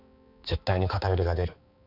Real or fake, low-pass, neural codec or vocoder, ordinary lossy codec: fake; 5.4 kHz; codec, 16 kHz, 4 kbps, X-Codec, HuBERT features, trained on balanced general audio; none